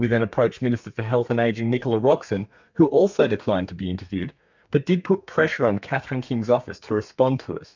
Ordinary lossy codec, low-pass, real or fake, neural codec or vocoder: MP3, 64 kbps; 7.2 kHz; fake; codec, 32 kHz, 1.9 kbps, SNAC